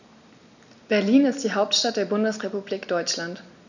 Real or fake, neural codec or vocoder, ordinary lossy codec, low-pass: real; none; none; 7.2 kHz